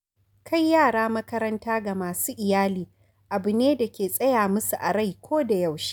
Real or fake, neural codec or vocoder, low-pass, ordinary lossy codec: real; none; none; none